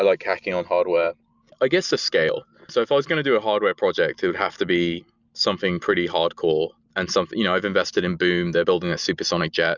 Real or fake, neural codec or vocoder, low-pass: real; none; 7.2 kHz